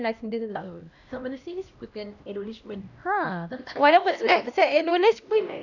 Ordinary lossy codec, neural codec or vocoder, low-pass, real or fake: none; codec, 16 kHz, 1 kbps, X-Codec, HuBERT features, trained on LibriSpeech; 7.2 kHz; fake